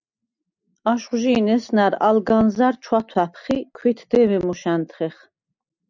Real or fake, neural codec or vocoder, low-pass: real; none; 7.2 kHz